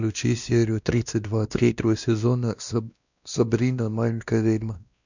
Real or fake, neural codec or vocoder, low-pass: fake; codec, 16 kHz, 1 kbps, X-Codec, WavLM features, trained on Multilingual LibriSpeech; 7.2 kHz